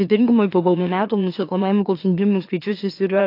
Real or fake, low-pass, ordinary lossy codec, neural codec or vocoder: fake; 5.4 kHz; AAC, 32 kbps; autoencoder, 44.1 kHz, a latent of 192 numbers a frame, MeloTTS